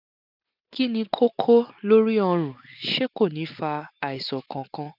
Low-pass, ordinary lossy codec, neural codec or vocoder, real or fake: 5.4 kHz; none; none; real